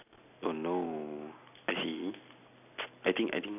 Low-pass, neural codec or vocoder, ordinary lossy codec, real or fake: 3.6 kHz; none; none; real